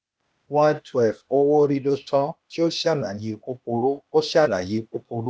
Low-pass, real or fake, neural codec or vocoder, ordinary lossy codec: none; fake; codec, 16 kHz, 0.8 kbps, ZipCodec; none